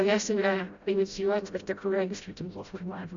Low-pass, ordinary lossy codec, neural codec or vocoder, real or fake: 7.2 kHz; Opus, 64 kbps; codec, 16 kHz, 0.5 kbps, FreqCodec, smaller model; fake